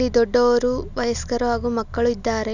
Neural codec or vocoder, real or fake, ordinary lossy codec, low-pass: none; real; none; 7.2 kHz